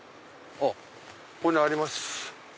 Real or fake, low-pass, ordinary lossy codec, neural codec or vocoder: real; none; none; none